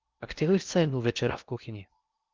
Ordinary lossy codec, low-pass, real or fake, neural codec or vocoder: Opus, 24 kbps; 7.2 kHz; fake; codec, 16 kHz in and 24 kHz out, 0.8 kbps, FocalCodec, streaming, 65536 codes